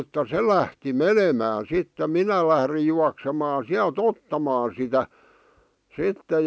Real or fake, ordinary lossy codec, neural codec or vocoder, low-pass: real; none; none; none